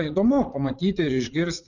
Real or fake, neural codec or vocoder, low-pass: fake; vocoder, 44.1 kHz, 80 mel bands, Vocos; 7.2 kHz